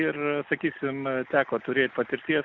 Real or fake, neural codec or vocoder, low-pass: real; none; 7.2 kHz